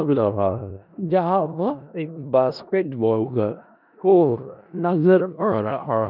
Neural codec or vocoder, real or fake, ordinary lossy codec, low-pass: codec, 16 kHz in and 24 kHz out, 0.4 kbps, LongCat-Audio-Codec, four codebook decoder; fake; none; 5.4 kHz